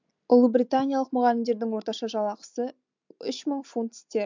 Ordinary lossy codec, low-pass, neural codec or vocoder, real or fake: MP3, 64 kbps; 7.2 kHz; none; real